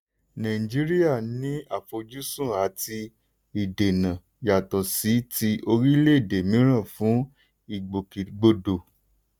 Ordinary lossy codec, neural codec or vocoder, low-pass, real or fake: none; none; none; real